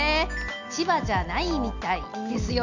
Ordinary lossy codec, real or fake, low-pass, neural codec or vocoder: none; real; 7.2 kHz; none